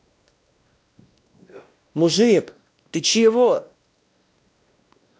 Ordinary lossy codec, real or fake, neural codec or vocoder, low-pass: none; fake; codec, 16 kHz, 1 kbps, X-Codec, WavLM features, trained on Multilingual LibriSpeech; none